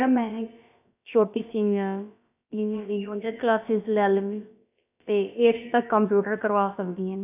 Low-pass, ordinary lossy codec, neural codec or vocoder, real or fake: 3.6 kHz; none; codec, 16 kHz, about 1 kbps, DyCAST, with the encoder's durations; fake